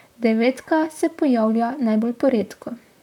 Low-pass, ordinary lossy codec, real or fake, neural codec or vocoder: 19.8 kHz; none; fake; vocoder, 44.1 kHz, 128 mel bands, Pupu-Vocoder